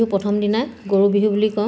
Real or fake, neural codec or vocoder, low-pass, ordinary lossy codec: real; none; none; none